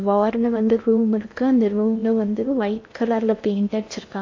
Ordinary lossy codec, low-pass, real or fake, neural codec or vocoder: AAC, 48 kbps; 7.2 kHz; fake; codec, 16 kHz in and 24 kHz out, 0.6 kbps, FocalCodec, streaming, 2048 codes